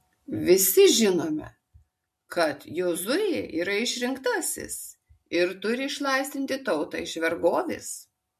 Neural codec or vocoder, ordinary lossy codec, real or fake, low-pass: none; MP3, 64 kbps; real; 14.4 kHz